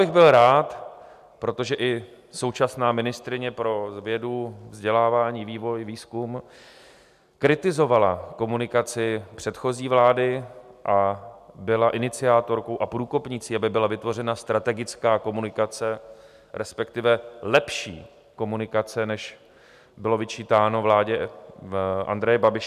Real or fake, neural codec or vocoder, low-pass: real; none; 14.4 kHz